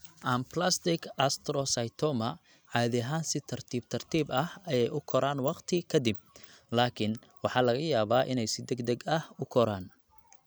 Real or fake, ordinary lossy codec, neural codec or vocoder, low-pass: real; none; none; none